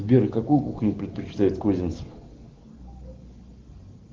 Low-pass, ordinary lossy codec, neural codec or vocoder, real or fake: 7.2 kHz; Opus, 16 kbps; none; real